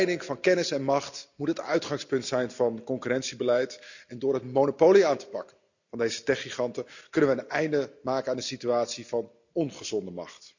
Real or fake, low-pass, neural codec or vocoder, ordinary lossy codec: real; 7.2 kHz; none; none